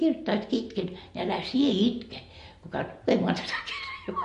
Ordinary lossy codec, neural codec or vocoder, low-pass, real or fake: MP3, 48 kbps; none; 14.4 kHz; real